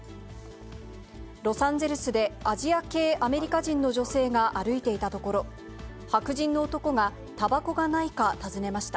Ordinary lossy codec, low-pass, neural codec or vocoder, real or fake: none; none; none; real